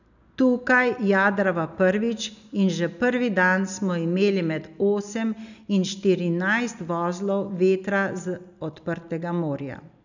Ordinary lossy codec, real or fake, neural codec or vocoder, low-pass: none; real; none; 7.2 kHz